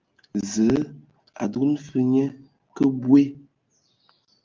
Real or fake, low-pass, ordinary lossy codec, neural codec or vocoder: real; 7.2 kHz; Opus, 24 kbps; none